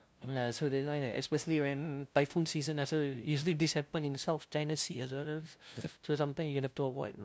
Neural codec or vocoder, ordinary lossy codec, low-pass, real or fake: codec, 16 kHz, 0.5 kbps, FunCodec, trained on LibriTTS, 25 frames a second; none; none; fake